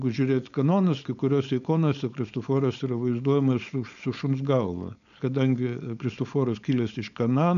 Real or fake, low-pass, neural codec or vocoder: fake; 7.2 kHz; codec, 16 kHz, 4.8 kbps, FACodec